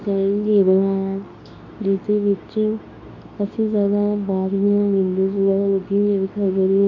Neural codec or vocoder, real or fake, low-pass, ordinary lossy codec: codec, 24 kHz, 0.9 kbps, WavTokenizer, medium speech release version 2; fake; 7.2 kHz; MP3, 48 kbps